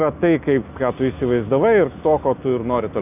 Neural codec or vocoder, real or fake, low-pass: none; real; 3.6 kHz